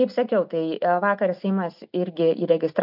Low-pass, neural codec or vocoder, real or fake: 5.4 kHz; none; real